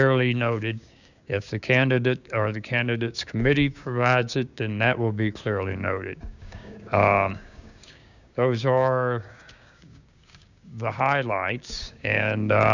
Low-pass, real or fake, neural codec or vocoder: 7.2 kHz; fake; codec, 16 kHz, 6 kbps, DAC